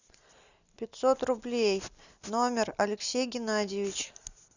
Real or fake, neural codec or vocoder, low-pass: real; none; 7.2 kHz